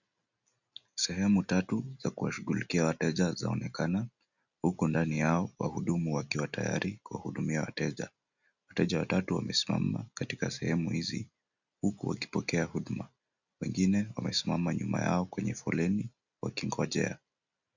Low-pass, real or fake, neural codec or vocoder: 7.2 kHz; real; none